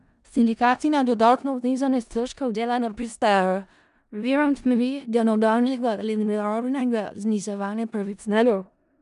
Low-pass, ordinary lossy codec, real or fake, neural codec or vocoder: 10.8 kHz; none; fake; codec, 16 kHz in and 24 kHz out, 0.4 kbps, LongCat-Audio-Codec, four codebook decoder